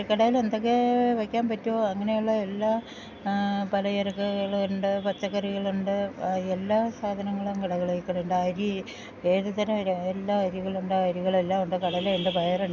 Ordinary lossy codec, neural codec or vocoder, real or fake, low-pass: none; none; real; 7.2 kHz